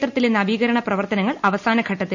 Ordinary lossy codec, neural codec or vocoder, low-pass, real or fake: none; none; 7.2 kHz; real